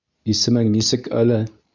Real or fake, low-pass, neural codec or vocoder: fake; 7.2 kHz; codec, 24 kHz, 0.9 kbps, WavTokenizer, medium speech release version 2